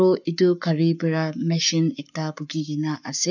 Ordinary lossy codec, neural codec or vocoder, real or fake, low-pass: none; codec, 44.1 kHz, 7.8 kbps, Pupu-Codec; fake; 7.2 kHz